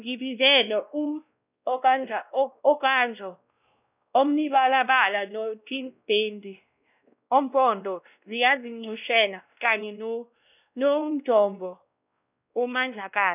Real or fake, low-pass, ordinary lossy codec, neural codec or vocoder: fake; 3.6 kHz; none; codec, 16 kHz, 1 kbps, X-Codec, WavLM features, trained on Multilingual LibriSpeech